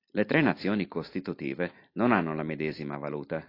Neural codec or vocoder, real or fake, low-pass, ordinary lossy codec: none; real; 5.4 kHz; AAC, 32 kbps